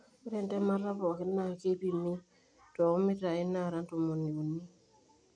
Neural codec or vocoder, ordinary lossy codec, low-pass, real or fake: none; none; 9.9 kHz; real